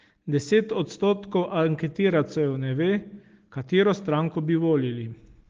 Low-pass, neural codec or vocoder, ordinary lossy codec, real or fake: 7.2 kHz; none; Opus, 16 kbps; real